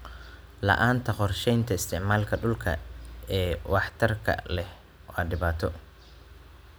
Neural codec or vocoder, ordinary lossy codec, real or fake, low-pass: none; none; real; none